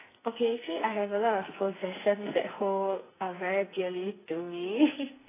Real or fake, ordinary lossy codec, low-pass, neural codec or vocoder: fake; AAC, 16 kbps; 3.6 kHz; codec, 44.1 kHz, 2.6 kbps, SNAC